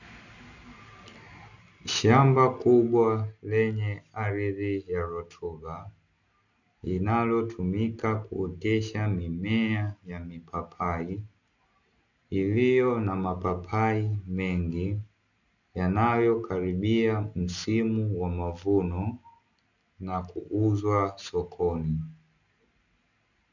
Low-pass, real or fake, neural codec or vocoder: 7.2 kHz; real; none